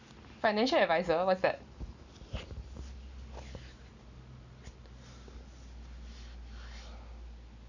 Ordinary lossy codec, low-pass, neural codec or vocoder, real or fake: none; 7.2 kHz; none; real